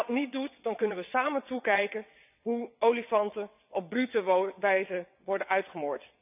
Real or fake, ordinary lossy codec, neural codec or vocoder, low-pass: fake; none; vocoder, 22.05 kHz, 80 mel bands, WaveNeXt; 3.6 kHz